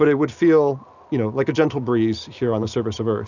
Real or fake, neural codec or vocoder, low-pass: real; none; 7.2 kHz